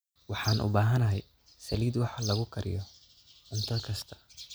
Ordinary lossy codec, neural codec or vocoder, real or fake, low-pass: none; none; real; none